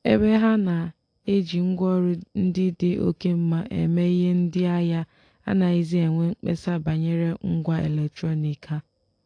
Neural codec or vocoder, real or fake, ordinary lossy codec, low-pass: none; real; AAC, 48 kbps; 9.9 kHz